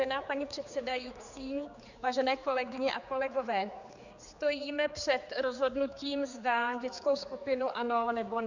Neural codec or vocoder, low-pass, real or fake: codec, 16 kHz, 4 kbps, X-Codec, HuBERT features, trained on general audio; 7.2 kHz; fake